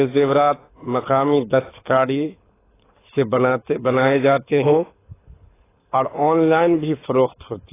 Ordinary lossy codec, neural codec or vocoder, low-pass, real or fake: AAC, 16 kbps; codec, 24 kHz, 6 kbps, HILCodec; 3.6 kHz; fake